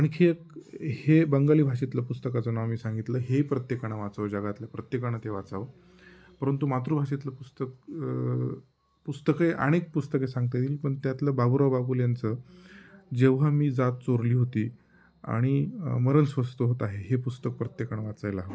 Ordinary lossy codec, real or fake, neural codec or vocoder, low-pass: none; real; none; none